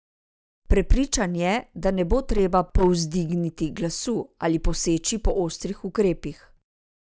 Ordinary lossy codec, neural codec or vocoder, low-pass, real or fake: none; none; none; real